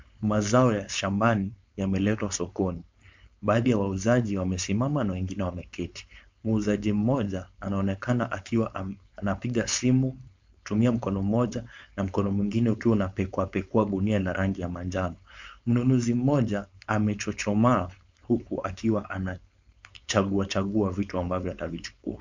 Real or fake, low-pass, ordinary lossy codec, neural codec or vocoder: fake; 7.2 kHz; MP3, 64 kbps; codec, 16 kHz, 4.8 kbps, FACodec